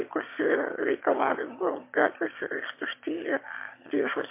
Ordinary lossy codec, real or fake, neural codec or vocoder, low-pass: MP3, 24 kbps; fake; autoencoder, 22.05 kHz, a latent of 192 numbers a frame, VITS, trained on one speaker; 3.6 kHz